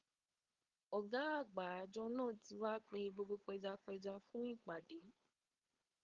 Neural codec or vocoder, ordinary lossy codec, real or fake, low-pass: codec, 16 kHz, 4.8 kbps, FACodec; Opus, 32 kbps; fake; 7.2 kHz